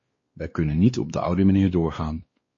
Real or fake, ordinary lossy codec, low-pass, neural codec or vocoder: fake; MP3, 32 kbps; 7.2 kHz; codec, 16 kHz, 2 kbps, X-Codec, WavLM features, trained on Multilingual LibriSpeech